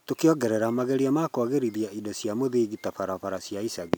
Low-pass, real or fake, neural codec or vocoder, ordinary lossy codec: none; real; none; none